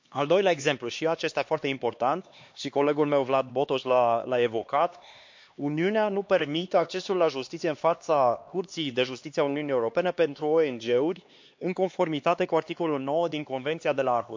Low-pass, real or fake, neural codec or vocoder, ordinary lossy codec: 7.2 kHz; fake; codec, 16 kHz, 2 kbps, X-Codec, HuBERT features, trained on LibriSpeech; MP3, 48 kbps